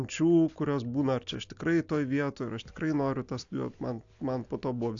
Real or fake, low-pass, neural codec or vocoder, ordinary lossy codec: real; 7.2 kHz; none; AAC, 64 kbps